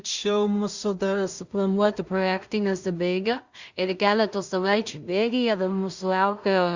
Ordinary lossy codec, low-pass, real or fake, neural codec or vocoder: Opus, 64 kbps; 7.2 kHz; fake; codec, 16 kHz in and 24 kHz out, 0.4 kbps, LongCat-Audio-Codec, two codebook decoder